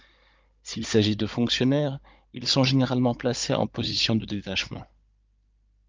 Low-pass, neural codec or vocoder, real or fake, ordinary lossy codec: 7.2 kHz; codec, 16 kHz, 16 kbps, FunCodec, trained on Chinese and English, 50 frames a second; fake; Opus, 24 kbps